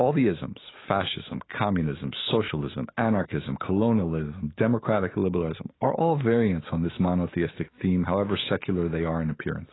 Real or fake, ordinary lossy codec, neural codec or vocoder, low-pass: fake; AAC, 16 kbps; autoencoder, 48 kHz, 128 numbers a frame, DAC-VAE, trained on Japanese speech; 7.2 kHz